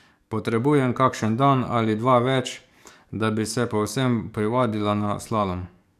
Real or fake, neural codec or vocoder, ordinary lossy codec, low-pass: fake; codec, 44.1 kHz, 7.8 kbps, DAC; none; 14.4 kHz